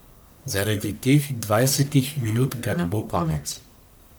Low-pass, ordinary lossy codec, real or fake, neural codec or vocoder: none; none; fake; codec, 44.1 kHz, 1.7 kbps, Pupu-Codec